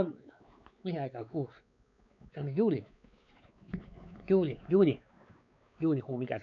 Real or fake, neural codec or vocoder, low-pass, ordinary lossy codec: fake; codec, 16 kHz, 4 kbps, X-Codec, WavLM features, trained on Multilingual LibriSpeech; 7.2 kHz; none